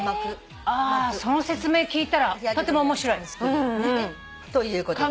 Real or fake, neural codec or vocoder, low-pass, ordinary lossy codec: real; none; none; none